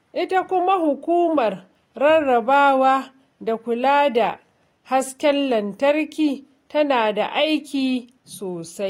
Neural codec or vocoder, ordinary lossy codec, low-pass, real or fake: none; AAC, 48 kbps; 19.8 kHz; real